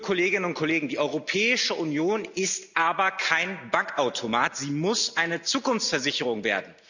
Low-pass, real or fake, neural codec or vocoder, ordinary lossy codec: 7.2 kHz; real; none; none